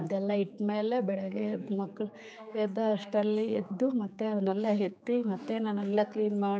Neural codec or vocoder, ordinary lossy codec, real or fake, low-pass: codec, 16 kHz, 4 kbps, X-Codec, HuBERT features, trained on general audio; none; fake; none